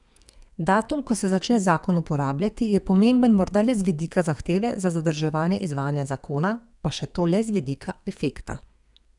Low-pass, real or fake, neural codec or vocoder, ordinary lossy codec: 10.8 kHz; fake; codec, 32 kHz, 1.9 kbps, SNAC; none